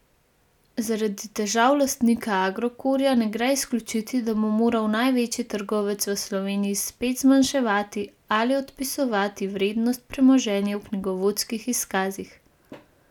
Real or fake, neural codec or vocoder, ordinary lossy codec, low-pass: real; none; none; 19.8 kHz